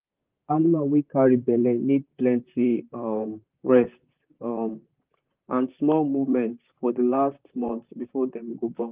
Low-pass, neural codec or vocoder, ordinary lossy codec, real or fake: 3.6 kHz; vocoder, 44.1 kHz, 128 mel bands, Pupu-Vocoder; Opus, 32 kbps; fake